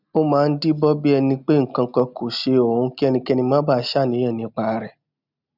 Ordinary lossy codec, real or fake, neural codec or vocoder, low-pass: none; real; none; 5.4 kHz